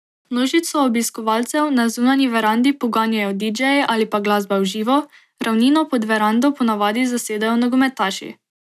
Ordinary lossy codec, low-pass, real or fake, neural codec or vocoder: none; 14.4 kHz; real; none